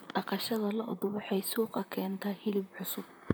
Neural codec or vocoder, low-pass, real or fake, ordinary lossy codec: vocoder, 44.1 kHz, 128 mel bands, Pupu-Vocoder; none; fake; none